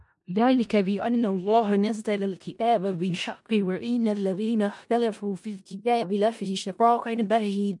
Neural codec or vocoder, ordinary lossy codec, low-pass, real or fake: codec, 16 kHz in and 24 kHz out, 0.4 kbps, LongCat-Audio-Codec, four codebook decoder; MP3, 64 kbps; 10.8 kHz; fake